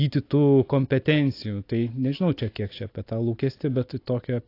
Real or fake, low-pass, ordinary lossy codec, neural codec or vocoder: real; 5.4 kHz; AAC, 32 kbps; none